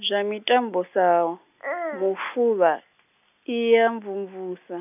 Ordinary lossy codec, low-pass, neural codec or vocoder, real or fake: none; 3.6 kHz; none; real